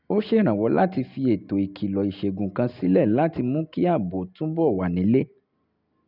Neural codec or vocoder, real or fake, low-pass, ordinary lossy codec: none; real; 5.4 kHz; none